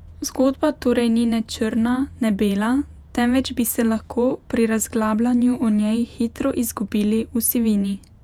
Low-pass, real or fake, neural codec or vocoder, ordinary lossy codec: 19.8 kHz; fake; vocoder, 48 kHz, 128 mel bands, Vocos; none